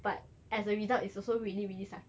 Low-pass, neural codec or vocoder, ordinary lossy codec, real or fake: none; none; none; real